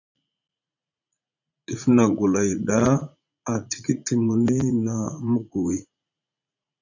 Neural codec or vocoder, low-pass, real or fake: vocoder, 44.1 kHz, 80 mel bands, Vocos; 7.2 kHz; fake